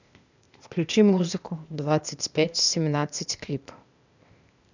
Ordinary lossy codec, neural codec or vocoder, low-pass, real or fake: none; codec, 16 kHz, 0.8 kbps, ZipCodec; 7.2 kHz; fake